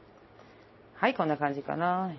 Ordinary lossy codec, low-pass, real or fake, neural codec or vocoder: MP3, 24 kbps; 7.2 kHz; real; none